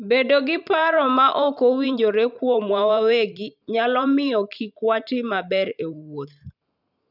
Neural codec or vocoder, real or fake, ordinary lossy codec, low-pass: vocoder, 44.1 kHz, 128 mel bands every 512 samples, BigVGAN v2; fake; none; 5.4 kHz